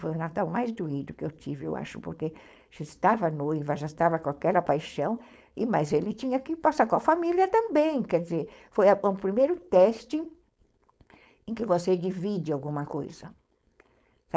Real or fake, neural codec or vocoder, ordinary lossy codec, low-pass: fake; codec, 16 kHz, 4.8 kbps, FACodec; none; none